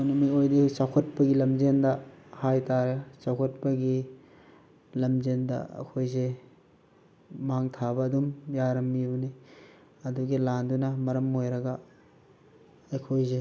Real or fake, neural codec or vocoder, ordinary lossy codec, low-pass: real; none; none; none